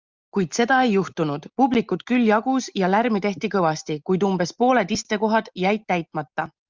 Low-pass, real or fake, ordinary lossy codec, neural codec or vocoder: 7.2 kHz; real; Opus, 24 kbps; none